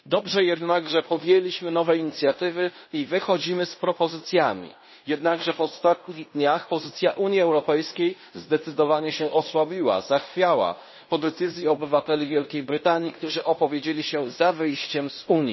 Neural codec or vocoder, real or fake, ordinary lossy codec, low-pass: codec, 16 kHz in and 24 kHz out, 0.9 kbps, LongCat-Audio-Codec, fine tuned four codebook decoder; fake; MP3, 24 kbps; 7.2 kHz